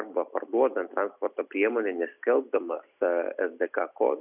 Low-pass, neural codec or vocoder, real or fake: 3.6 kHz; none; real